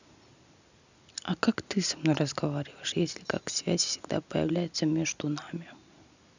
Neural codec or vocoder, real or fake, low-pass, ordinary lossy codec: none; real; 7.2 kHz; none